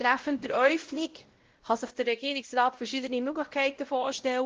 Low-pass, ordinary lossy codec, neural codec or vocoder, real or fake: 7.2 kHz; Opus, 16 kbps; codec, 16 kHz, 0.5 kbps, X-Codec, WavLM features, trained on Multilingual LibriSpeech; fake